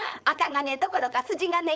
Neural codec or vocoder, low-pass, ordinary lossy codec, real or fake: codec, 16 kHz, 4.8 kbps, FACodec; none; none; fake